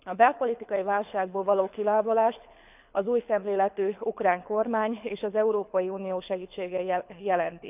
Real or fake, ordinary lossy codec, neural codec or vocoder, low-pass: fake; none; codec, 24 kHz, 6 kbps, HILCodec; 3.6 kHz